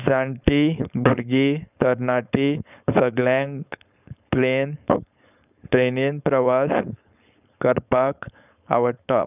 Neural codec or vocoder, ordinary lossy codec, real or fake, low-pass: codec, 16 kHz, 4.8 kbps, FACodec; none; fake; 3.6 kHz